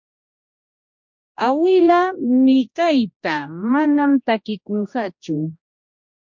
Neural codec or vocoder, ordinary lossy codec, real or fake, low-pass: codec, 16 kHz, 1 kbps, X-Codec, HuBERT features, trained on general audio; MP3, 48 kbps; fake; 7.2 kHz